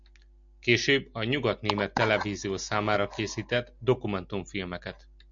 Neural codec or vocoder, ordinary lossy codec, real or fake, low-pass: none; MP3, 64 kbps; real; 7.2 kHz